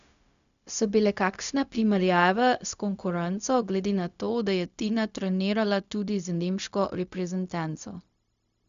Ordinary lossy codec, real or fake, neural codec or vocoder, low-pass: none; fake; codec, 16 kHz, 0.4 kbps, LongCat-Audio-Codec; 7.2 kHz